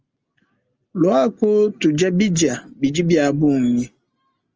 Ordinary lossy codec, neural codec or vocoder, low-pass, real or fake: Opus, 24 kbps; none; 7.2 kHz; real